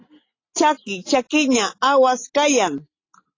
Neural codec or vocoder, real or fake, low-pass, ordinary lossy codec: none; real; 7.2 kHz; AAC, 32 kbps